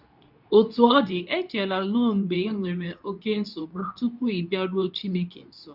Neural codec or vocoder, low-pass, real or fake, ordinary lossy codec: codec, 24 kHz, 0.9 kbps, WavTokenizer, medium speech release version 2; 5.4 kHz; fake; none